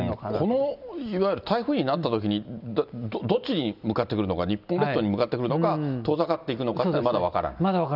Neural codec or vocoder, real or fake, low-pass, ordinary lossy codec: none; real; 5.4 kHz; none